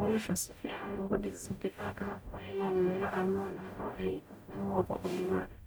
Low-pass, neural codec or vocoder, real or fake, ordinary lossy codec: none; codec, 44.1 kHz, 0.9 kbps, DAC; fake; none